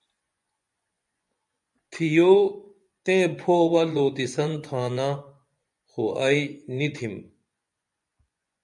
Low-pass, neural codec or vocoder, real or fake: 10.8 kHz; vocoder, 24 kHz, 100 mel bands, Vocos; fake